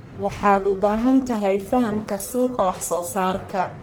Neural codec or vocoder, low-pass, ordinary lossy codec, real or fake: codec, 44.1 kHz, 1.7 kbps, Pupu-Codec; none; none; fake